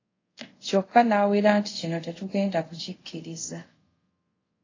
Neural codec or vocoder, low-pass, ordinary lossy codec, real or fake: codec, 24 kHz, 0.5 kbps, DualCodec; 7.2 kHz; AAC, 32 kbps; fake